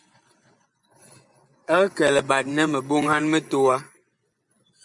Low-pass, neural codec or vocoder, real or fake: 10.8 kHz; vocoder, 24 kHz, 100 mel bands, Vocos; fake